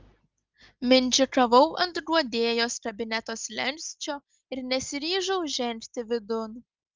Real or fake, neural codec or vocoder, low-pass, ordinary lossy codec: real; none; 7.2 kHz; Opus, 24 kbps